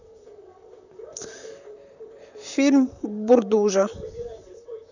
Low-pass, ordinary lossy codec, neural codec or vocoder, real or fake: 7.2 kHz; none; vocoder, 44.1 kHz, 128 mel bands, Pupu-Vocoder; fake